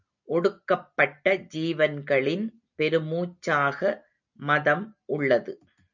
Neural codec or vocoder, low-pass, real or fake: none; 7.2 kHz; real